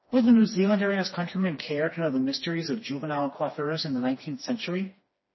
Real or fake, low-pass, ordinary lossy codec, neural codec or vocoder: fake; 7.2 kHz; MP3, 24 kbps; codec, 16 kHz, 2 kbps, FreqCodec, smaller model